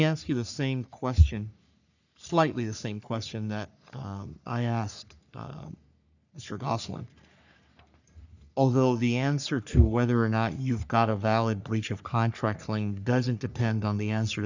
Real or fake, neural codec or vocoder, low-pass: fake; codec, 44.1 kHz, 3.4 kbps, Pupu-Codec; 7.2 kHz